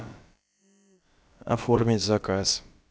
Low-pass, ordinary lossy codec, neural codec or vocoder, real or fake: none; none; codec, 16 kHz, about 1 kbps, DyCAST, with the encoder's durations; fake